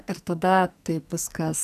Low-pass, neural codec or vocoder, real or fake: 14.4 kHz; codec, 44.1 kHz, 2.6 kbps, SNAC; fake